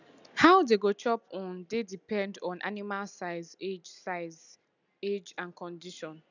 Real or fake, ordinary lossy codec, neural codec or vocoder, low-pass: real; none; none; 7.2 kHz